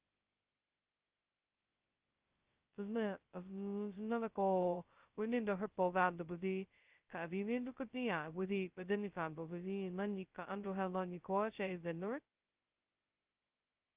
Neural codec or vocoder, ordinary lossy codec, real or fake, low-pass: codec, 16 kHz, 0.2 kbps, FocalCodec; Opus, 32 kbps; fake; 3.6 kHz